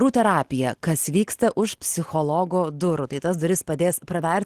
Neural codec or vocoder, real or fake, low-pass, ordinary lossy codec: none; real; 14.4 kHz; Opus, 16 kbps